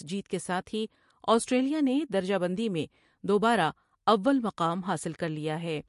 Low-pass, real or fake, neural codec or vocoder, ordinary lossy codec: 14.4 kHz; real; none; MP3, 48 kbps